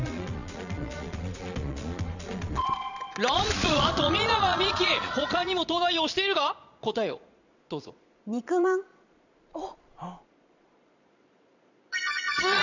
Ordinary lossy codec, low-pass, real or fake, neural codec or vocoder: none; 7.2 kHz; fake; vocoder, 22.05 kHz, 80 mel bands, Vocos